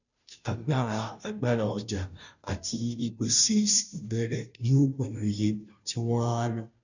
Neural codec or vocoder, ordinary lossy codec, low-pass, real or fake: codec, 16 kHz, 0.5 kbps, FunCodec, trained on Chinese and English, 25 frames a second; none; 7.2 kHz; fake